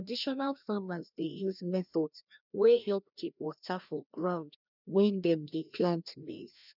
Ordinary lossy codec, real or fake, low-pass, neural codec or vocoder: none; fake; 5.4 kHz; codec, 16 kHz, 1 kbps, FreqCodec, larger model